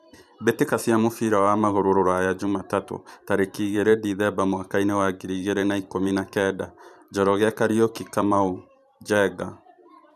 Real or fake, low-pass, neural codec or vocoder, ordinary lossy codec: fake; 14.4 kHz; vocoder, 44.1 kHz, 128 mel bands every 512 samples, BigVGAN v2; none